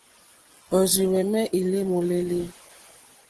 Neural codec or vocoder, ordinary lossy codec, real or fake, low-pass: vocoder, 24 kHz, 100 mel bands, Vocos; Opus, 16 kbps; fake; 10.8 kHz